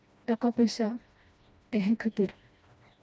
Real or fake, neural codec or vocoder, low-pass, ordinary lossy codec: fake; codec, 16 kHz, 1 kbps, FreqCodec, smaller model; none; none